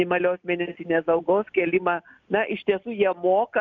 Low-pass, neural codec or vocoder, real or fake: 7.2 kHz; none; real